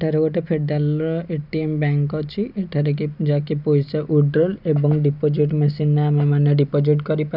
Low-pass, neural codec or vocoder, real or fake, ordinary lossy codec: 5.4 kHz; none; real; none